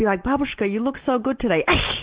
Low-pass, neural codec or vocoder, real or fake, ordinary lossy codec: 3.6 kHz; none; real; Opus, 16 kbps